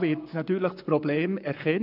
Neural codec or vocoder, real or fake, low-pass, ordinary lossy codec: codec, 44.1 kHz, 7.8 kbps, Pupu-Codec; fake; 5.4 kHz; none